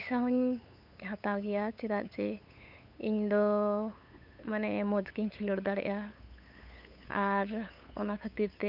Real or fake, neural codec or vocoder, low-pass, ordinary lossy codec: fake; codec, 16 kHz, 8 kbps, FunCodec, trained on LibriTTS, 25 frames a second; 5.4 kHz; none